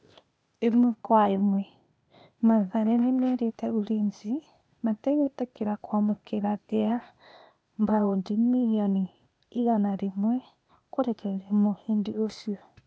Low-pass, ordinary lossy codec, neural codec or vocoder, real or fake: none; none; codec, 16 kHz, 0.8 kbps, ZipCodec; fake